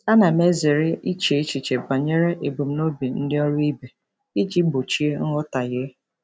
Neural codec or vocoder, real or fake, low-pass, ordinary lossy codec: none; real; none; none